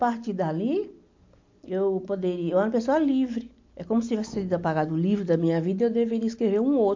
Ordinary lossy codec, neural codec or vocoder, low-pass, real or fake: MP3, 48 kbps; none; 7.2 kHz; real